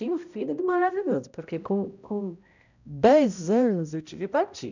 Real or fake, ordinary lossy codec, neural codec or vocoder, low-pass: fake; none; codec, 16 kHz, 0.5 kbps, X-Codec, HuBERT features, trained on balanced general audio; 7.2 kHz